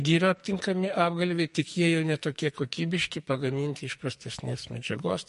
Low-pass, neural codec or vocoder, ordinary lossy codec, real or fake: 14.4 kHz; codec, 44.1 kHz, 2.6 kbps, SNAC; MP3, 48 kbps; fake